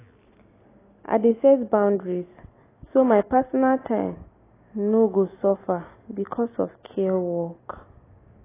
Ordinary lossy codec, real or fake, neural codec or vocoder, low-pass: AAC, 16 kbps; real; none; 3.6 kHz